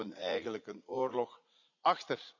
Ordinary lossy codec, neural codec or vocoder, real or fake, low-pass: none; vocoder, 44.1 kHz, 80 mel bands, Vocos; fake; 7.2 kHz